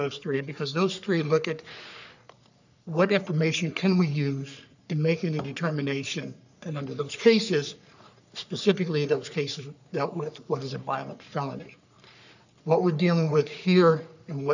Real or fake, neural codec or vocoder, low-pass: fake; codec, 44.1 kHz, 3.4 kbps, Pupu-Codec; 7.2 kHz